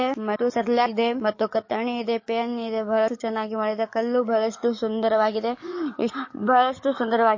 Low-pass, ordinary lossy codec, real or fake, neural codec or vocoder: 7.2 kHz; MP3, 32 kbps; fake; codec, 44.1 kHz, 7.8 kbps, DAC